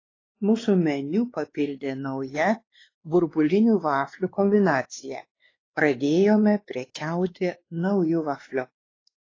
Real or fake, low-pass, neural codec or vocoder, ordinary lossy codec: fake; 7.2 kHz; codec, 16 kHz, 2 kbps, X-Codec, WavLM features, trained on Multilingual LibriSpeech; AAC, 32 kbps